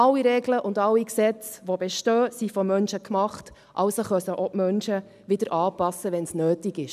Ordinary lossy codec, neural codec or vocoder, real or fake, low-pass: none; none; real; 14.4 kHz